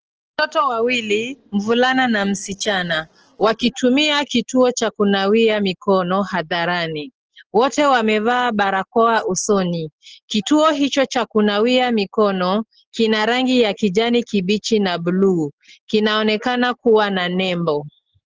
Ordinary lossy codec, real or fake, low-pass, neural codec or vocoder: Opus, 16 kbps; real; 7.2 kHz; none